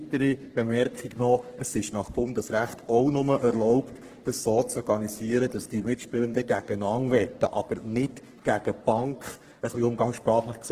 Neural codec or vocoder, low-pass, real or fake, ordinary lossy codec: codec, 44.1 kHz, 3.4 kbps, Pupu-Codec; 14.4 kHz; fake; Opus, 16 kbps